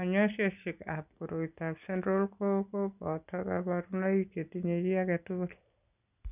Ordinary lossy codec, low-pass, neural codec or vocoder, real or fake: none; 3.6 kHz; none; real